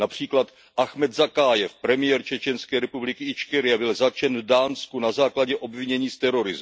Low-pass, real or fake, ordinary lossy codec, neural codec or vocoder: none; real; none; none